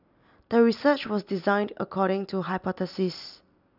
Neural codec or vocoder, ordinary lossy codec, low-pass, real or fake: none; none; 5.4 kHz; real